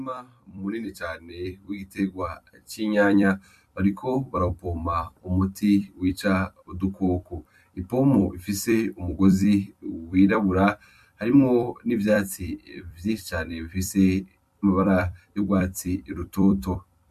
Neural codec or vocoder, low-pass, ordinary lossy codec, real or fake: vocoder, 44.1 kHz, 128 mel bands every 256 samples, BigVGAN v2; 14.4 kHz; MP3, 64 kbps; fake